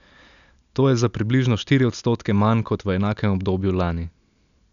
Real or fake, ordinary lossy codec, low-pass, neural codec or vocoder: real; none; 7.2 kHz; none